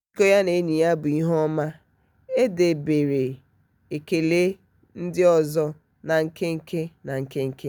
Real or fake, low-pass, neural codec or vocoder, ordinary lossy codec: real; none; none; none